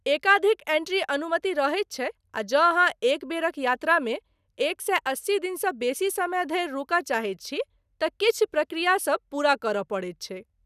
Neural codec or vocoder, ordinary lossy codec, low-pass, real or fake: none; none; 14.4 kHz; real